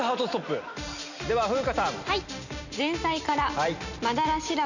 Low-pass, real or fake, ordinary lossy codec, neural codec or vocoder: 7.2 kHz; real; none; none